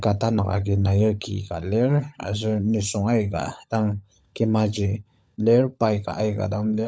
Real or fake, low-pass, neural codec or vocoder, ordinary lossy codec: fake; none; codec, 16 kHz, 16 kbps, FunCodec, trained on LibriTTS, 50 frames a second; none